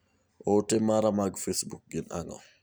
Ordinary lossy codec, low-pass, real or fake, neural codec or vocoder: none; none; real; none